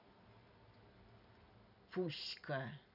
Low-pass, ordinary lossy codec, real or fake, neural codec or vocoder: 5.4 kHz; none; real; none